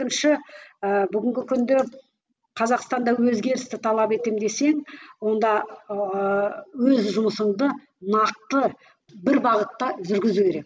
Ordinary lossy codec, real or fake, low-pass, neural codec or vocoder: none; real; none; none